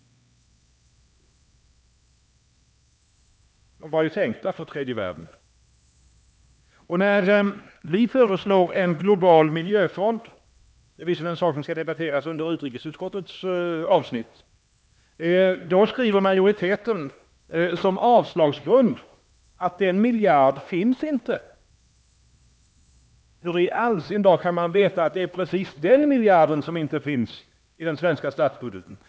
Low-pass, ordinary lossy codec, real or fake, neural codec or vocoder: none; none; fake; codec, 16 kHz, 2 kbps, X-Codec, HuBERT features, trained on LibriSpeech